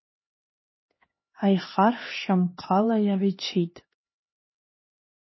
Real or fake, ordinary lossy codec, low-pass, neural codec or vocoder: fake; MP3, 24 kbps; 7.2 kHz; codec, 16 kHz, 2 kbps, X-Codec, HuBERT features, trained on LibriSpeech